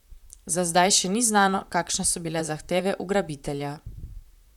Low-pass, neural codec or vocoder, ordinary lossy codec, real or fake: 19.8 kHz; vocoder, 44.1 kHz, 128 mel bands, Pupu-Vocoder; none; fake